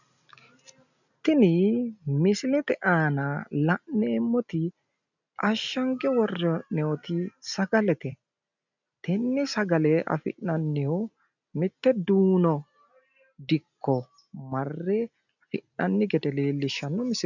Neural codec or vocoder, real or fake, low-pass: none; real; 7.2 kHz